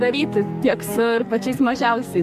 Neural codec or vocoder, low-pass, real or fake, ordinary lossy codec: codec, 44.1 kHz, 2.6 kbps, SNAC; 14.4 kHz; fake; MP3, 64 kbps